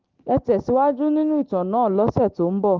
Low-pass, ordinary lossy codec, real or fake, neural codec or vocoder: 7.2 kHz; Opus, 16 kbps; real; none